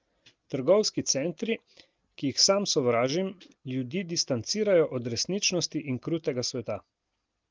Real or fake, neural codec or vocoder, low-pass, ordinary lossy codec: real; none; 7.2 kHz; Opus, 32 kbps